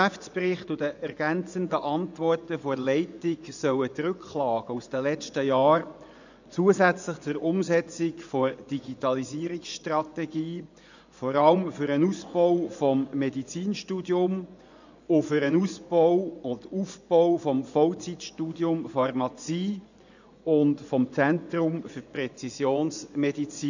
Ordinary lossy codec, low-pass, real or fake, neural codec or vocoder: none; 7.2 kHz; fake; vocoder, 24 kHz, 100 mel bands, Vocos